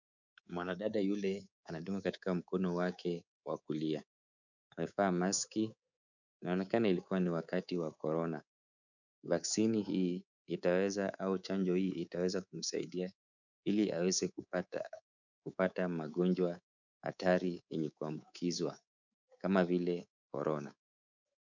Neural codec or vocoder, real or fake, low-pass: codec, 24 kHz, 3.1 kbps, DualCodec; fake; 7.2 kHz